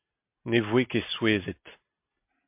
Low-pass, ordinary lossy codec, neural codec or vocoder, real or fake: 3.6 kHz; MP3, 32 kbps; none; real